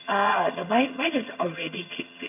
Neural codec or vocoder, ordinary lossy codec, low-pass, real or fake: vocoder, 22.05 kHz, 80 mel bands, HiFi-GAN; none; 3.6 kHz; fake